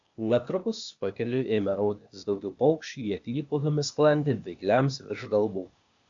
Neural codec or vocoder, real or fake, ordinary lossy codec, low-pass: codec, 16 kHz, 0.8 kbps, ZipCodec; fake; AAC, 64 kbps; 7.2 kHz